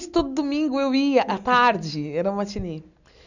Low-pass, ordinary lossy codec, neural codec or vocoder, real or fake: 7.2 kHz; none; none; real